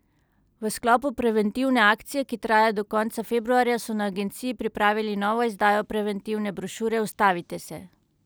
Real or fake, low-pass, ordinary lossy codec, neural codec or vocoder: real; none; none; none